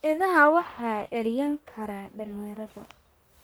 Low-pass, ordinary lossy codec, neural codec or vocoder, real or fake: none; none; codec, 44.1 kHz, 1.7 kbps, Pupu-Codec; fake